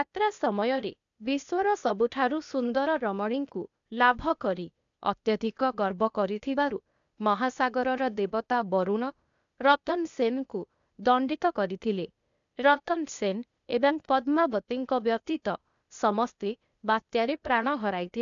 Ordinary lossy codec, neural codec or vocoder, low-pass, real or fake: none; codec, 16 kHz, 0.8 kbps, ZipCodec; 7.2 kHz; fake